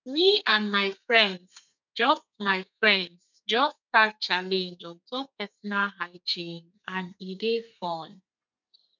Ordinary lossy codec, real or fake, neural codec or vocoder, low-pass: none; fake; codec, 32 kHz, 1.9 kbps, SNAC; 7.2 kHz